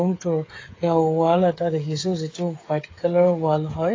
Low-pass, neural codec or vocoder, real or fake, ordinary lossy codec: 7.2 kHz; codec, 16 kHz, 8 kbps, FreqCodec, smaller model; fake; AAC, 32 kbps